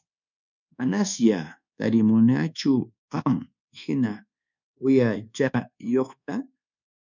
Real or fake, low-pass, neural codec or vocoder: fake; 7.2 kHz; codec, 24 kHz, 1.2 kbps, DualCodec